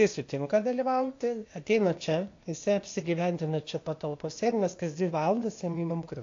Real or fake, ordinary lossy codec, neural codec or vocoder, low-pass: fake; AAC, 48 kbps; codec, 16 kHz, 0.8 kbps, ZipCodec; 7.2 kHz